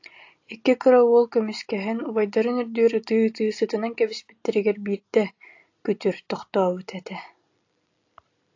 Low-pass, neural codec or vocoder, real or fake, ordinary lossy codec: 7.2 kHz; none; real; AAC, 48 kbps